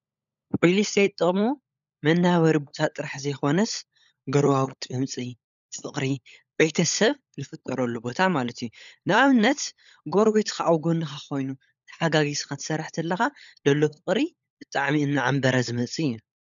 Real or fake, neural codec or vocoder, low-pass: fake; codec, 16 kHz, 16 kbps, FunCodec, trained on LibriTTS, 50 frames a second; 7.2 kHz